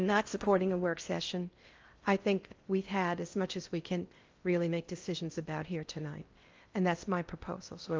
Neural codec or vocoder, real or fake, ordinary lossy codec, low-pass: codec, 16 kHz in and 24 kHz out, 0.6 kbps, FocalCodec, streaming, 4096 codes; fake; Opus, 32 kbps; 7.2 kHz